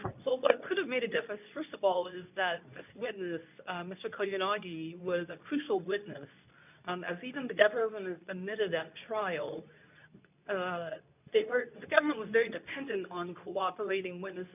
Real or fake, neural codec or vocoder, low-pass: fake; codec, 24 kHz, 0.9 kbps, WavTokenizer, medium speech release version 2; 3.6 kHz